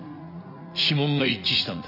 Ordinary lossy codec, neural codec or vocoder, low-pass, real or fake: none; vocoder, 44.1 kHz, 80 mel bands, Vocos; 5.4 kHz; fake